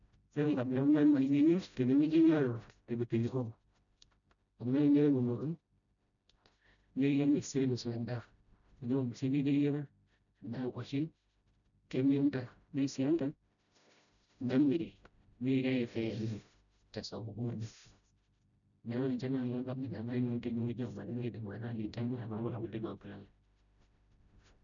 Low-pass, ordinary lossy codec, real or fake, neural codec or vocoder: 7.2 kHz; none; fake; codec, 16 kHz, 0.5 kbps, FreqCodec, smaller model